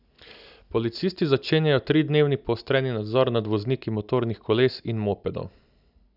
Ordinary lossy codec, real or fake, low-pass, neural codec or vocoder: none; real; 5.4 kHz; none